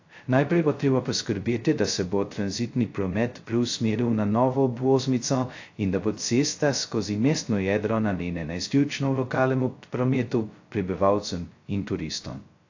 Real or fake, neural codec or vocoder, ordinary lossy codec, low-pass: fake; codec, 16 kHz, 0.2 kbps, FocalCodec; AAC, 48 kbps; 7.2 kHz